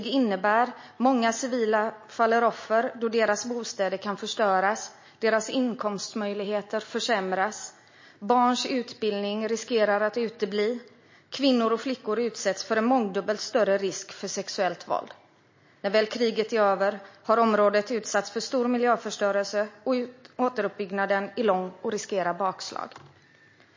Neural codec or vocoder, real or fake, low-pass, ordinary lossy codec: none; real; 7.2 kHz; MP3, 32 kbps